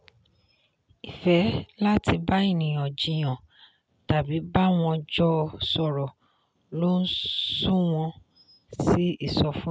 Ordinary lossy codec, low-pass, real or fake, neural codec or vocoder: none; none; real; none